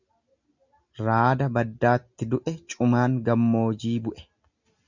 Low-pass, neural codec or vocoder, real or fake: 7.2 kHz; none; real